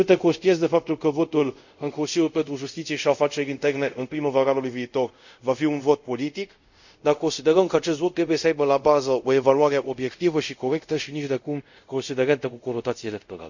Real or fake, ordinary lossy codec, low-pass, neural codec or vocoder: fake; none; 7.2 kHz; codec, 24 kHz, 0.5 kbps, DualCodec